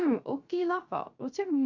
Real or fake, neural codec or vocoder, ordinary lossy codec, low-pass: fake; codec, 16 kHz, 0.3 kbps, FocalCodec; Opus, 64 kbps; 7.2 kHz